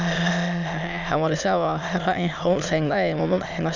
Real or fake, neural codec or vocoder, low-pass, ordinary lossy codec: fake; autoencoder, 22.05 kHz, a latent of 192 numbers a frame, VITS, trained on many speakers; 7.2 kHz; none